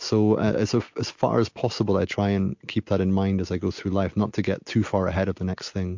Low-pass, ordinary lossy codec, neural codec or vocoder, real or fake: 7.2 kHz; MP3, 48 kbps; none; real